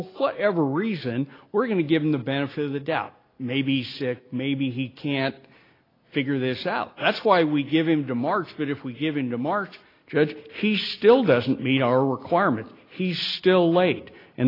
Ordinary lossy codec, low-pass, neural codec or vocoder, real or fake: AAC, 24 kbps; 5.4 kHz; none; real